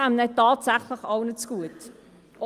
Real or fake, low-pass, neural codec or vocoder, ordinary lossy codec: real; 14.4 kHz; none; Opus, 32 kbps